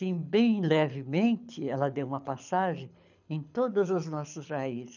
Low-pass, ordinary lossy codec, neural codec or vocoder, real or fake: 7.2 kHz; none; codec, 24 kHz, 6 kbps, HILCodec; fake